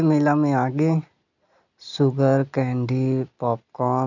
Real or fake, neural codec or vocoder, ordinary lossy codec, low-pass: real; none; none; 7.2 kHz